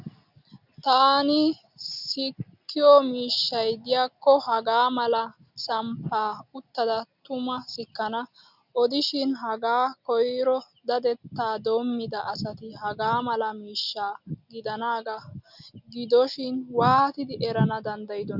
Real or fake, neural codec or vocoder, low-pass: real; none; 5.4 kHz